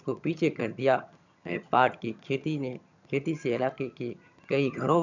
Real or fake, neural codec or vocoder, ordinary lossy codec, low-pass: fake; vocoder, 22.05 kHz, 80 mel bands, HiFi-GAN; none; 7.2 kHz